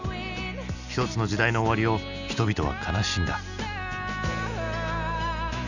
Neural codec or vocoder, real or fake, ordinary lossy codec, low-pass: none; real; none; 7.2 kHz